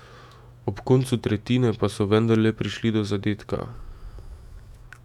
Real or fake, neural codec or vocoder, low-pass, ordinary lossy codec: fake; autoencoder, 48 kHz, 128 numbers a frame, DAC-VAE, trained on Japanese speech; 19.8 kHz; none